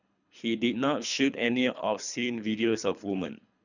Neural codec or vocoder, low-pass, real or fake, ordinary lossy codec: codec, 24 kHz, 3 kbps, HILCodec; 7.2 kHz; fake; none